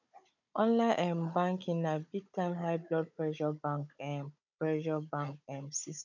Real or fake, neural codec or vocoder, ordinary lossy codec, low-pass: fake; codec, 16 kHz, 16 kbps, FunCodec, trained on Chinese and English, 50 frames a second; none; 7.2 kHz